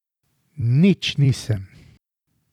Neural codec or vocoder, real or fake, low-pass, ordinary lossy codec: vocoder, 44.1 kHz, 128 mel bands every 256 samples, BigVGAN v2; fake; 19.8 kHz; none